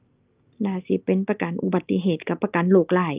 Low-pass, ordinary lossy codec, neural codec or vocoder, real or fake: 3.6 kHz; Opus, 24 kbps; none; real